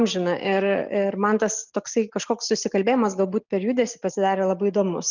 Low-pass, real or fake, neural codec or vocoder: 7.2 kHz; real; none